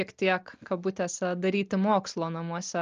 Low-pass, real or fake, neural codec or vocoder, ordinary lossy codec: 7.2 kHz; real; none; Opus, 24 kbps